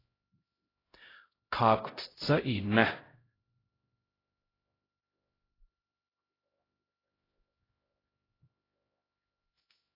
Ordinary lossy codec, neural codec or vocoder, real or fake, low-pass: AAC, 24 kbps; codec, 16 kHz, 0.5 kbps, X-Codec, HuBERT features, trained on LibriSpeech; fake; 5.4 kHz